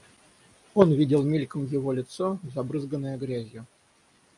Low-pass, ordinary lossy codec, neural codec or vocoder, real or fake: 10.8 kHz; MP3, 64 kbps; none; real